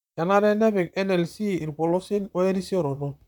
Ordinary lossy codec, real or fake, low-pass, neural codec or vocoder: none; fake; 19.8 kHz; vocoder, 44.1 kHz, 128 mel bands, Pupu-Vocoder